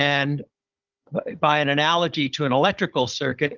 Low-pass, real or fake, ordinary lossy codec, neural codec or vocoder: 7.2 kHz; fake; Opus, 24 kbps; codec, 16 kHz, 16 kbps, FunCodec, trained on Chinese and English, 50 frames a second